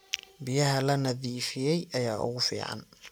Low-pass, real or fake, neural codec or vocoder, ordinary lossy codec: none; real; none; none